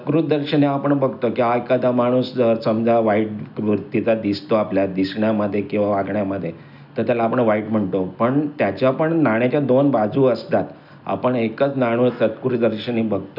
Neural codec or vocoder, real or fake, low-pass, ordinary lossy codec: none; real; 5.4 kHz; none